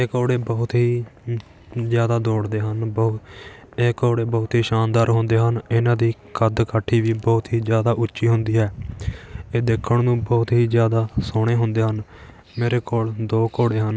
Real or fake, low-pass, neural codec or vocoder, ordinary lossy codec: real; none; none; none